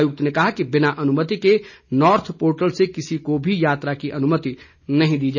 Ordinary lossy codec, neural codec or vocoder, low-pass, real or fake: none; none; 7.2 kHz; real